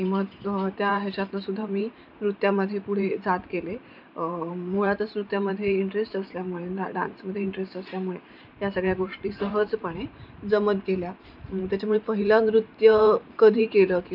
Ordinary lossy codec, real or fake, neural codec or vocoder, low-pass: none; fake; vocoder, 44.1 kHz, 128 mel bands every 512 samples, BigVGAN v2; 5.4 kHz